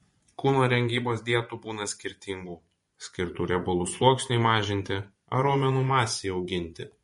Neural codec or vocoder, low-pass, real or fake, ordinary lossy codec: vocoder, 24 kHz, 100 mel bands, Vocos; 10.8 kHz; fake; MP3, 48 kbps